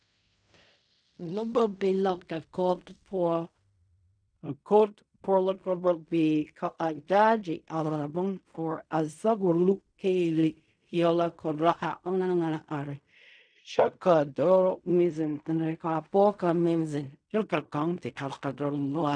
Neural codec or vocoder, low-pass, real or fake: codec, 16 kHz in and 24 kHz out, 0.4 kbps, LongCat-Audio-Codec, fine tuned four codebook decoder; 9.9 kHz; fake